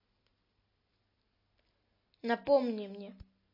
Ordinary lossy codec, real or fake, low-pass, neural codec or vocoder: MP3, 24 kbps; real; 5.4 kHz; none